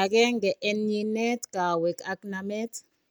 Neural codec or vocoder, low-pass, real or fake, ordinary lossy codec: none; none; real; none